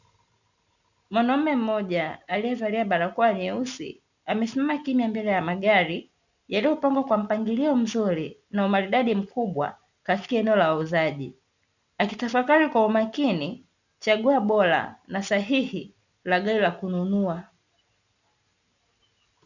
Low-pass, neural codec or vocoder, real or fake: 7.2 kHz; none; real